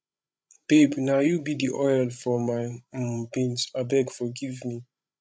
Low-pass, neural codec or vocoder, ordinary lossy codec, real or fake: none; codec, 16 kHz, 16 kbps, FreqCodec, larger model; none; fake